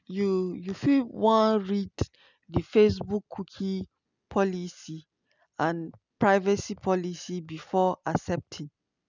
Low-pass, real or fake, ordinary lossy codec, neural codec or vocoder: 7.2 kHz; real; none; none